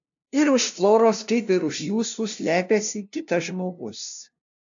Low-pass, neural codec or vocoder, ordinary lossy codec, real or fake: 7.2 kHz; codec, 16 kHz, 0.5 kbps, FunCodec, trained on LibriTTS, 25 frames a second; AAC, 64 kbps; fake